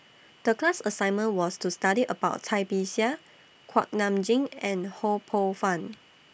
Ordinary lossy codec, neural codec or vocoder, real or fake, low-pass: none; none; real; none